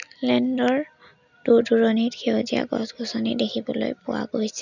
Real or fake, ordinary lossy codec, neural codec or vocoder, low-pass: real; none; none; 7.2 kHz